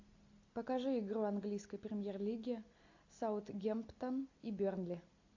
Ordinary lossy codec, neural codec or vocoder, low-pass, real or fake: AAC, 48 kbps; none; 7.2 kHz; real